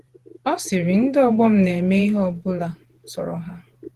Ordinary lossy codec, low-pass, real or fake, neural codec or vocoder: Opus, 16 kbps; 14.4 kHz; fake; vocoder, 48 kHz, 128 mel bands, Vocos